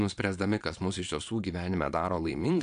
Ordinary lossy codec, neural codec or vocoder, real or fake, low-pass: AAC, 64 kbps; vocoder, 22.05 kHz, 80 mel bands, Vocos; fake; 9.9 kHz